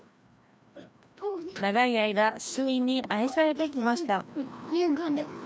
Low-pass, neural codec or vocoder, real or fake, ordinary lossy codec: none; codec, 16 kHz, 1 kbps, FreqCodec, larger model; fake; none